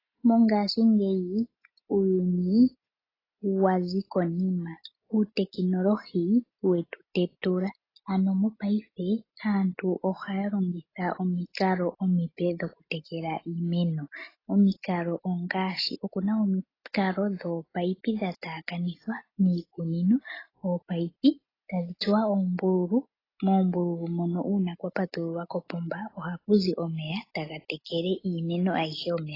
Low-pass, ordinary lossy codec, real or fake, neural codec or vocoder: 5.4 kHz; AAC, 24 kbps; real; none